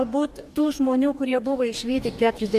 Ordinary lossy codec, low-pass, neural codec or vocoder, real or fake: MP3, 96 kbps; 14.4 kHz; codec, 44.1 kHz, 2.6 kbps, DAC; fake